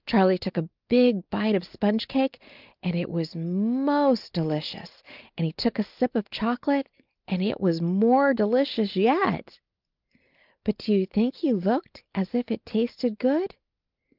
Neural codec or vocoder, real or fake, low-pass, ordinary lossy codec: none; real; 5.4 kHz; Opus, 32 kbps